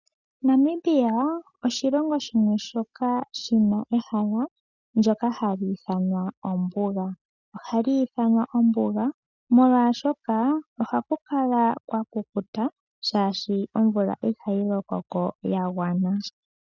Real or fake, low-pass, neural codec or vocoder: real; 7.2 kHz; none